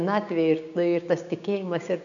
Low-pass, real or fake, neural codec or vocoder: 7.2 kHz; fake; codec, 16 kHz, 6 kbps, DAC